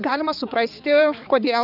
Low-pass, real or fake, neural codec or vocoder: 5.4 kHz; fake; codec, 16 kHz, 4 kbps, X-Codec, HuBERT features, trained on balanced general audio